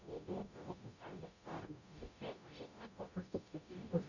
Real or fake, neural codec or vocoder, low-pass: fake; codec, 44.1 kHz, 0.9 kbps, DAC; 7.2 kHz